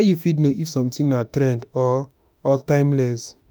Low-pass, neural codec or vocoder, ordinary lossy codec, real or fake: none; autoencoder, 48 kHz, 32 numbers a frame, DAC-VAE, trained on Japanese speech; none; fake